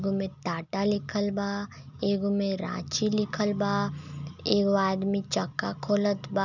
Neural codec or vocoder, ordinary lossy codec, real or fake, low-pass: none; none; real; 7.2 kHz